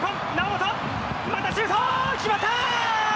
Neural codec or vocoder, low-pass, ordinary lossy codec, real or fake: none; none; none; real